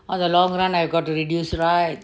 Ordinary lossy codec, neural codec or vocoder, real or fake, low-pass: none; none; real; none